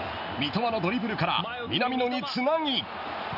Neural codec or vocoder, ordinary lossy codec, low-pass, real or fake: none; none; 5.4 kHz; real